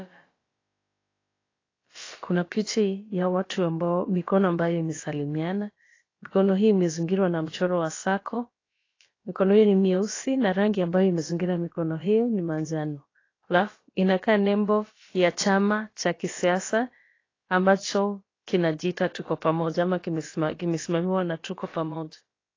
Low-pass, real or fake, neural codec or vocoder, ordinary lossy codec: 7.2 kHz; fake; codec, 16 kHz, about 1 kbps, DyCAST, with the encoder's durations; AAC, 32 kbps